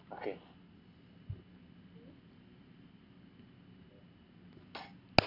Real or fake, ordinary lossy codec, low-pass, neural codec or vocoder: real; none; 5.4 kHz; none